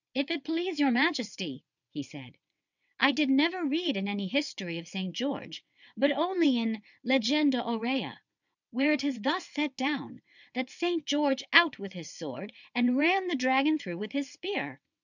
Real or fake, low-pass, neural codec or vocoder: fake; 7.2 kHz; vocoder, 22.05 kHz, 80 mel bands, WaveNeXt